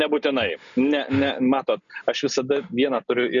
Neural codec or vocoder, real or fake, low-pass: none; real; 7.2 kHz